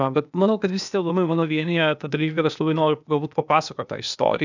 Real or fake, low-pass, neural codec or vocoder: fake; 7.2 kHz; codec, 16 kHz, 0.8 kbps, ZipCodec